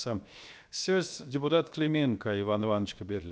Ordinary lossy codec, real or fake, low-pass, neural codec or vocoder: none; fake; none; codec, 16 kHz, 0.7 kbps, FocalCodec